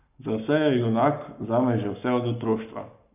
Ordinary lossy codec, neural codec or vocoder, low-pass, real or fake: none; codec, 44.1 kHz, 7.8 kbps, Pupu-Codec; 3.6 kHz; fake